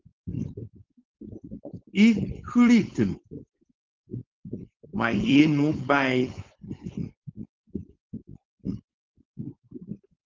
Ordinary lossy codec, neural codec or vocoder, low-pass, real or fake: Opus, 32 kbps; codec, 16 kHz, 4.8 kbps, FACodec; 7.2 kHz; fake